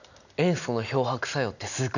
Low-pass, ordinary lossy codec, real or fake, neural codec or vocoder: 7.2 kHz; none; real; none